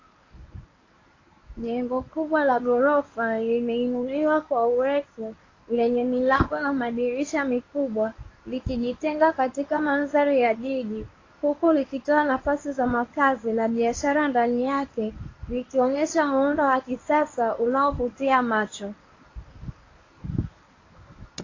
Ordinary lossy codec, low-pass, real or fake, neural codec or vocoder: AAC, 32 kbps; 7.2 kHz; fake; codec, 24 kHz, 0.9 kbps, WavTokenizer, medium speech release version 1